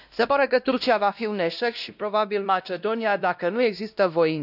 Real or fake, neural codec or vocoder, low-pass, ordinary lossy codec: fake; codec, 16 kHz, 1 kbps, X-Codec, WavLM features, trained on Multilingual LibriSpeech; 5.4 kHz; none